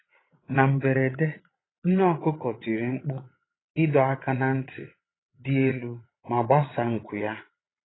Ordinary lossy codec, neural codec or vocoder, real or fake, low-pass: AAC, 16 kbps; vocoder, 22.05 kHz, 80 mel bands, Vocos; fake; 7.2 kHz